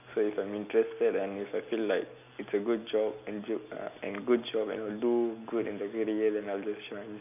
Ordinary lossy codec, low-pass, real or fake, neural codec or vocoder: none; 3.6 kHz; real; none